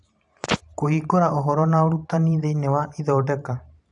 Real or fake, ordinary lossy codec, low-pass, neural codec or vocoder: fake; none; 10.8 kHz; vocoder, 44.1 kHz, 128 mel bands every 512 samples, BigVGAN v2